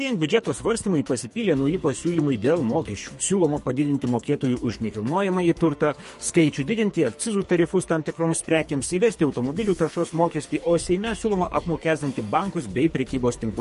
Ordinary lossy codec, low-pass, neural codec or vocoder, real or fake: MP3, 48 kbps; 14.4 kHz; codec, 44.1 kHz, 2.6 kbps, SNAC; fake